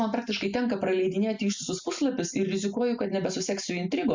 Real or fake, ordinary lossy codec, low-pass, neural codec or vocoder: real; MP3, 64 kbps; 7.2 kHz; none